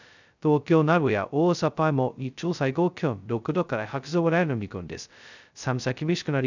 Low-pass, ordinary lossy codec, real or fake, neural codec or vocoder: 7.2 kHz; none; fake; codec, 16 kHz, 0.2 kbps, FocalCodec